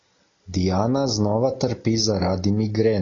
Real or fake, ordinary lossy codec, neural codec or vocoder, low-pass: real; AAC, 32 kbps; none; 7.2 kHz